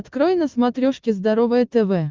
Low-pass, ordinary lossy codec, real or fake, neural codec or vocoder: 7.2 kHz; Opus, 24 kbps; real; none